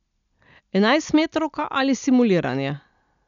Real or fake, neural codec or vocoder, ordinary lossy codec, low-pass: real; none; none; 7.2 kHz